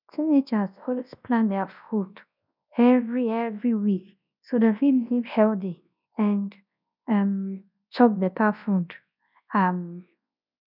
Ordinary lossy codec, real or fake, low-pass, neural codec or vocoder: none; fake; 5.4 kHz; codec, 24 kHz, 0.9 kbps, WavTokenizer, large speech release